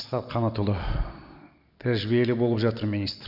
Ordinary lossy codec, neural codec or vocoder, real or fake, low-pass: none; none; real; 5.4 kHz